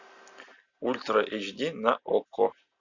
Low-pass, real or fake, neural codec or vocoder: 7.2 kHz; real; none